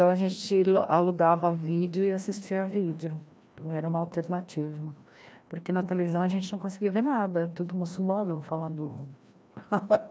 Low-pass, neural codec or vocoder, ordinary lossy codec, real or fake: none; codec, 16 kHz, 1 kbps, FreqCodec, larger model; none; fake